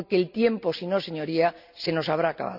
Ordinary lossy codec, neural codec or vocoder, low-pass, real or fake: none; none; 5.4 kHz; real